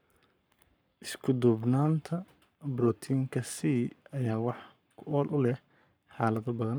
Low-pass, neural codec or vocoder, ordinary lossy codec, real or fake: none; codec, 44.1 kHz, 7.8 kbps, Pupu-Codec; none; fake